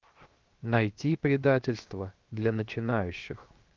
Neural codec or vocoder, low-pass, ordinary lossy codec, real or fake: codec, 16 kHz, 0.7 kbps, FocalCodec; 7.2 kHz; Opus, 32 kbps; fake